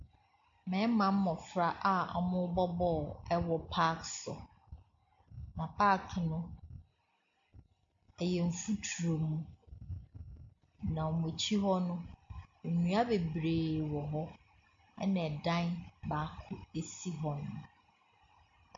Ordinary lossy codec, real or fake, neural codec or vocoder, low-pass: MP3, 48 kbps; real; none; 7.2 kHz